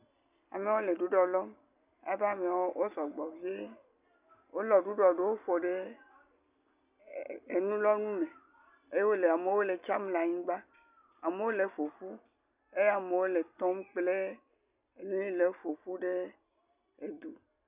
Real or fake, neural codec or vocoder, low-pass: real; none; 3.6 kHz